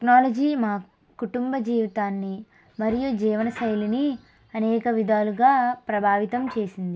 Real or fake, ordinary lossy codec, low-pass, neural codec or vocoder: real; none; none; none